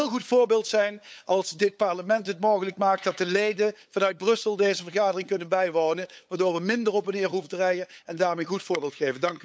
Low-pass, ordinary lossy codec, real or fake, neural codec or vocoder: none; none; fake; codec, 16 kHz, 8 kbps, FunCodec, trained on LibriTTS, 25 frames a second